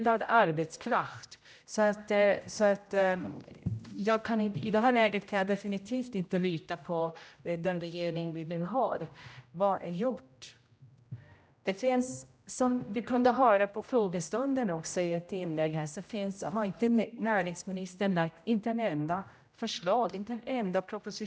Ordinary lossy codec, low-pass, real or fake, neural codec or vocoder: none; none; fake; codec, 16 kHz, 0.5 kbps, X-Codec, HuBERT features, trained on general audio